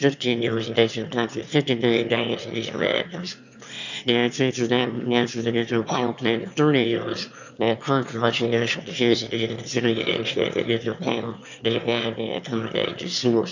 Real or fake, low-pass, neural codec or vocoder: fake; 7.2 kHz; autoencoder, 22.05 kHz, a latent of 192 numbers a frame, VITS, trained on one speaker